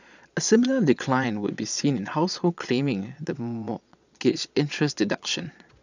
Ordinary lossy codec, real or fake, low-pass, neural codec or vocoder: none; fake; 7.2 kHz; vocoder, 22.05 kHz, 80 mel bands, Vocos